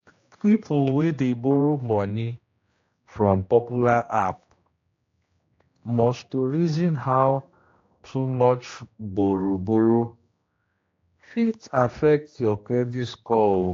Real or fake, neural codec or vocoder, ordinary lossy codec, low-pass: fake; codec, 16 kHz, 1 kbps, X-Codec, HuBERT features, trained on general audio; AAC, 48 kbps; 7.2 kHz